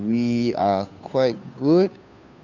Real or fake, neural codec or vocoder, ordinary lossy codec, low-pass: fake; codec, 16 kHz, 2 kbps, FunCodec, trained on Chinese and English, 25 frames a second; none; 7.2 kHz